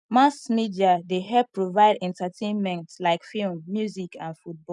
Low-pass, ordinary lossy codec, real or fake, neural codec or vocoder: 10.8 kHz; none; real; none